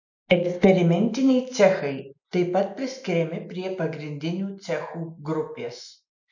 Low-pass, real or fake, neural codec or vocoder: 7.2 kHz; real; none